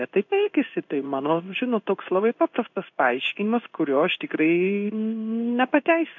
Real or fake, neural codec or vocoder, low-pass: fake; codec, 16 kHz in and 24 kHz out, 1 kbps, XY-Tokenizer; 7.2 kHz